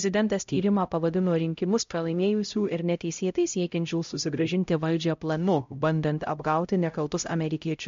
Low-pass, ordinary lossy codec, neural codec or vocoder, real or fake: 7.2 kHz; MP3, 48 kbps; codec, 16 kHz, 0.5 kbps, X-Codec, HuBERT features, trained on LibriSpeech; fake